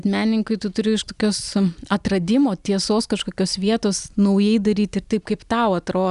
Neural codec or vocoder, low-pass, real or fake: none; 10.8 kHz; real